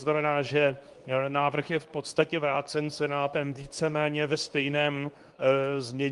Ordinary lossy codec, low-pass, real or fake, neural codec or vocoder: Opus, 32 kbps; 10.8 kHz; fake; codec, 24 kHz, 0.9 kbps, WavTokenizer, medium speech release version 2